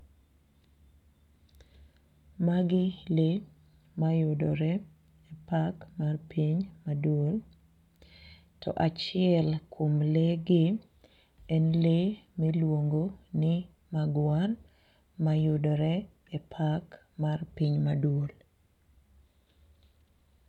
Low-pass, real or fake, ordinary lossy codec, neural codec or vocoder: 19.8 kHz; real; none; none